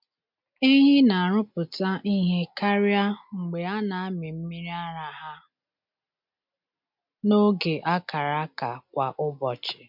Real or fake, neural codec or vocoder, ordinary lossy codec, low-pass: real; none; MP3, 48 kbps; 5.4 kHz